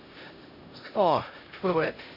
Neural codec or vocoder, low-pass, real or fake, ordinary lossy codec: codec, 16 kHz, 0.5 kbps, X-Codec, HuBERT features, trained on LibriSpeech; 5.4 kHz; fake; none